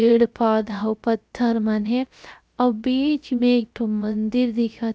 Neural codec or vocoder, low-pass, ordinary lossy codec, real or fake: codec, 16 kHz, 0.3 kbps, FocalCodec; none; none; fake